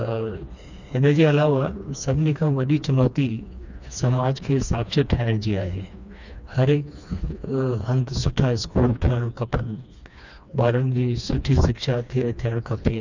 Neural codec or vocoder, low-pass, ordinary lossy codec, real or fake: codec, 16 kHz, 2 kbps, FreqCodec, smaller model; 7.2 kHz; none; fake